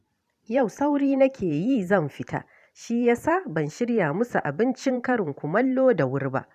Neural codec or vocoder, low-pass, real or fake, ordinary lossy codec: vocoder, 44.1 kHz, 128 mel bands every 512 samples, BigVGAN v2; 14.4 kHz; fake; none